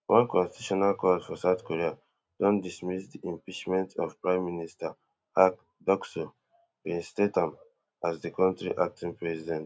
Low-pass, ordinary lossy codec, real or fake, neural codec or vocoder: none; none; real; none